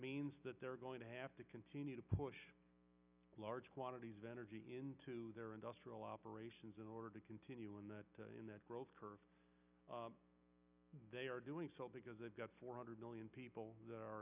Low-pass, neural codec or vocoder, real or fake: 3.6 kHz; none; real